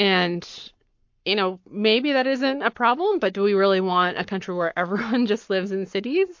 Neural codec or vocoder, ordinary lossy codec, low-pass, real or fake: codec, 44.1 kHz, 7.8 kbps, Pupu-Codec; MP3, 48 kbps; 7.2 kHz; fake